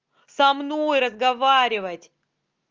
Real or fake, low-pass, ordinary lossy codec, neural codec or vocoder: real; 7.2 kHz; Opus, 24 kbps; none